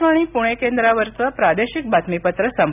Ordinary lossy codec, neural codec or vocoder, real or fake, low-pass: none; none; real; 3.6 kHz